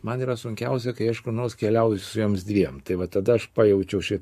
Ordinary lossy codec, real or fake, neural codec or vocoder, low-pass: MP3, 64 kbps; fake; codec, 44.1 kHz, 7.8 kbps, Pupu-Codec; 14.4 kHz